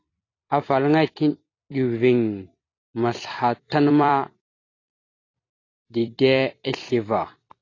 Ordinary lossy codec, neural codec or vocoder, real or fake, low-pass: AAC, 32 kbps; vocoder, 44.1 kHz, 128 mel bands every 256 samples, BigVGAN v2; fake; 7.2 kHz